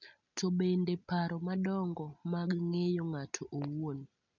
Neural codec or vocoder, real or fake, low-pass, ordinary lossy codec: none; real; 7.2 kHz; none